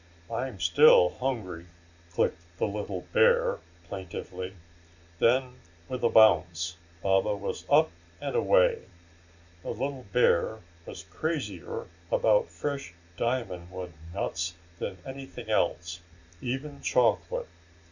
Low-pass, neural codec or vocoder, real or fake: 7.2 kHz; none; real